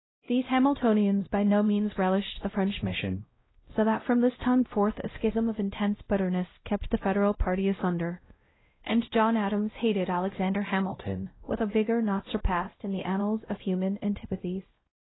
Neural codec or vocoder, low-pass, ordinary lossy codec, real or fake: codec, 16 kHz, 0.5 kbps, X-Codec, WavLM features, trained on Multilingual LibriSpeech; 7.2 kHz; AAC, 16 kbps; fake